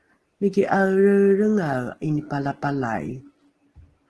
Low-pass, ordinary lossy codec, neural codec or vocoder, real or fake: 10.8 kHz; Opus, 16 kbps; none; real